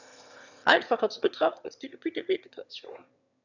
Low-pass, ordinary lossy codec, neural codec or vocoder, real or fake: 7.2 kHz; none; autoencoder, 22.05 kHz, a latent of 192 numbers a frame, VITS, trained on one speaker; fake